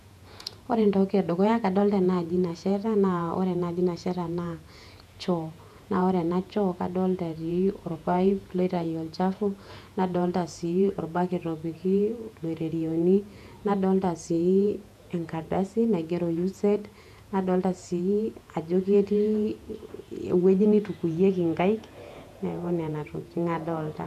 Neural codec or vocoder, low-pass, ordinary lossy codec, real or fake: vocoder, 48 kHz, 128 mel bands, Vocos; 14.4 kHz; none; fake